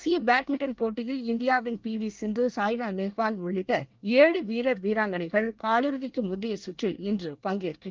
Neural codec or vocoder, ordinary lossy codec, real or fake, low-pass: codec, 24 kHz, 1 kbps, SNAC; Opus, 24 kbps; fake; 7.2 kHz